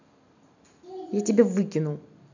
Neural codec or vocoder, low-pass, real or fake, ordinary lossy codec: none; 7.2 kHz; real; none